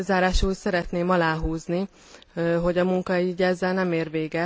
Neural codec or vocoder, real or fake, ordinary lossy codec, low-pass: none; real; none; none